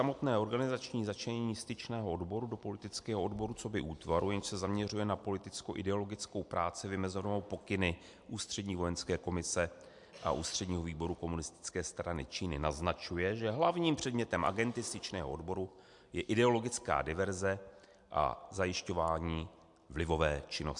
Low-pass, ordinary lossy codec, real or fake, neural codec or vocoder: 10.8 kHz; MP3, 64 kbps; real; none